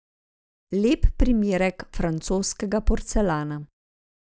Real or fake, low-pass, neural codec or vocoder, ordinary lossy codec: real; none; none; none